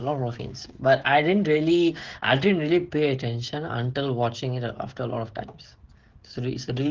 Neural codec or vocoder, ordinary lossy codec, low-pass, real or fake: codec, 16 kHz, 16 kbps, FreqCodec, smaller model; Opus, 16 kbps; 7.2 kHz; fake